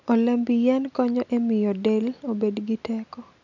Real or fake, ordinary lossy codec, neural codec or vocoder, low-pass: real; none; none; 7.2 kHz